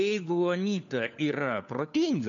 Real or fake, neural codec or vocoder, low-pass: fake; codec, 16 kHz, 4 kbps, FunCodec, trained on LibriTTS, 50 frames a second; 7.2 kHz